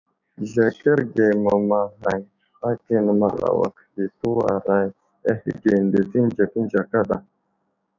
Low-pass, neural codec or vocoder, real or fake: 7.2 kHz; codec, 16 kHz, 6 kbps, DAC; fake